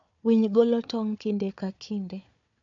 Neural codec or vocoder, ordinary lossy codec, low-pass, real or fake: codec, 16 kHz, 4 kbps, FunCodec, trained on Chinese and English, 50 frames a second; MP3, 64 kbps; 7.2 kHz; fake